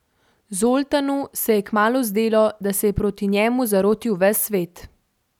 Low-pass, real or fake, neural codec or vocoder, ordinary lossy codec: 19.8 kHz; real; none; none